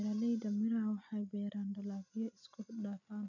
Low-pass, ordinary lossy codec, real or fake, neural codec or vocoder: 7.2 kHz; none; real; none